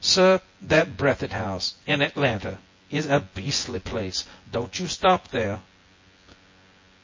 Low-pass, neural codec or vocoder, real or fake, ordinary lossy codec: 7.2 kHz; vocoder, 24 kHz, 100 mel bands, Vocos; fake; MP3, 32 kbps